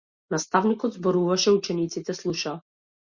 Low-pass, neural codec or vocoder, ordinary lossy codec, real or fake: 7.2 kHz; none; Opus, 64 kbps; real